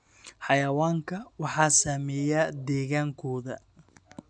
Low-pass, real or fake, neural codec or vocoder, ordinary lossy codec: 9.9 kHz; real; none; none